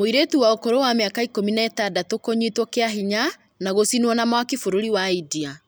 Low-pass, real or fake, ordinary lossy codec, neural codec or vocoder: none; real; none; none